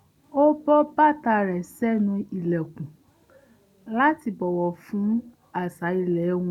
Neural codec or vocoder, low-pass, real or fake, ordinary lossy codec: none; 19.8 kHz; real; none